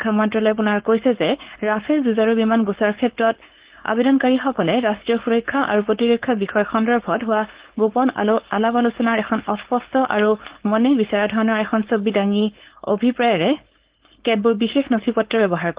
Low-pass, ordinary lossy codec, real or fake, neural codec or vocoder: 3.6 kHz; Opus, 16 kbps; fake; codec, 16 kHz, 4.8 kbps, FACodec